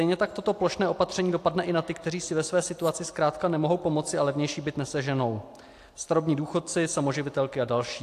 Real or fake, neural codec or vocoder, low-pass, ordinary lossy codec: real; none; 14.4 kHz; AAC, 64 kbps